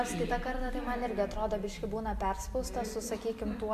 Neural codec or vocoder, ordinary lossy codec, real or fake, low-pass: none; MP3, 96 kbps; real; 14.4 kHz